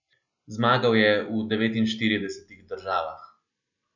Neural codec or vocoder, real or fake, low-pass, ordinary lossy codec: none; real; 7.2 kHz; none